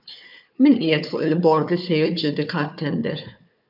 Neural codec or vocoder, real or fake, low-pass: codec, 16 kHz, 4 kbps, FunCodec, trained on Chinese and English, 50 frames a second; fake; 5.4 kHz